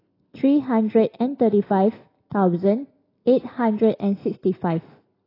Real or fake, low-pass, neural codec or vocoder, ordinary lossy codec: real; 5.4 kHz; none; AAC, 24 kbps